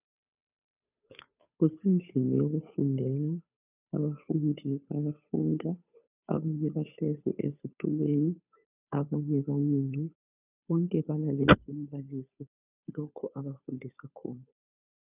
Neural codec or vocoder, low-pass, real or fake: codec, 16 kHz, 2 kbps, FunCodec, trained on Chinese and English, 25 frames a second; 3.6 kHz; fake